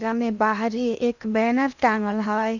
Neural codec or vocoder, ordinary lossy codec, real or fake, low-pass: codec, 16 kHz in and 24 kHz out, 0.8 kbps, FocalCodec, streaming, 65536 codes; none; fake; 7.2 kHz